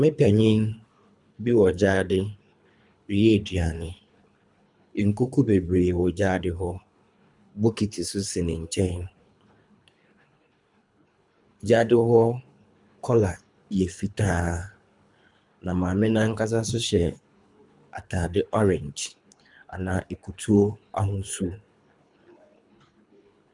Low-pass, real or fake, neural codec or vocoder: 10.8 kHz; fake; codec, 24 kHz, 3 kbps, HILCodec